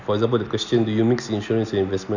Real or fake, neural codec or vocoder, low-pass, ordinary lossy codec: real; none; 7.2 kHz; none